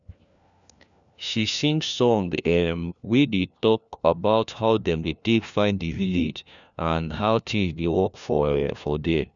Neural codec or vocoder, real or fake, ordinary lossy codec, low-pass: codec, 16 kHz, 1 kbps, FunCodec, trained on LibriTTS, 50 frames a second; fake; none; 7.2 kHz